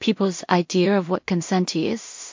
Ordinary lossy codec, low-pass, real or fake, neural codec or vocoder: MP3, 48 kbps; 7.2 kHz; fake; codec, 16 kHz in and 24 kHz out, 0.4 kbps, LongCat-Audio-Codec, two codebook decoder